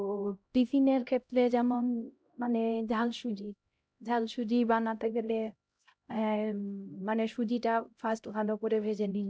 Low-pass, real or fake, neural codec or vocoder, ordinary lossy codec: none; fake; codec, 16 kHz, 0.5 kbps, X-Codec, HuBERT features, trained on LibriSpeech; none